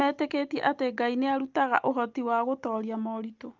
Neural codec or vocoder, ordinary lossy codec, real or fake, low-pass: none; Opus, 24 kbps; real; 7.2 kHz